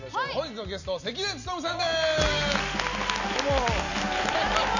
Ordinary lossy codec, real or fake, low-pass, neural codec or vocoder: none; real; 7.2 kHz; none